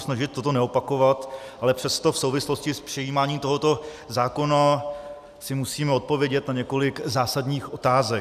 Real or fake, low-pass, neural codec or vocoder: real; 14.4 kHz; none